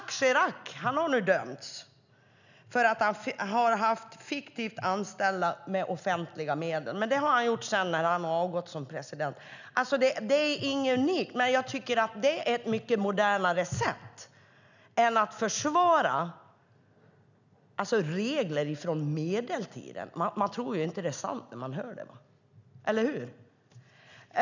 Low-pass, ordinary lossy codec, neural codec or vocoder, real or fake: 7.2 kHz; none; none; real